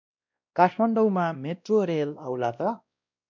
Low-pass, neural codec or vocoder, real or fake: 7.2 kHz; codec, 16 kHz, 1 kbps, X-Codec, WavLM features, trained on Multilingual LibriSpeech; fake